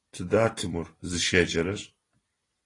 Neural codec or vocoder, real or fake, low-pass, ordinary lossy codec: none; real; 10.8 kHz; AAC, 32 kbps